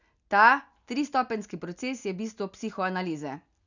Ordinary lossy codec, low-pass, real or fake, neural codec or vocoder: none; 7.2 kHz; real; none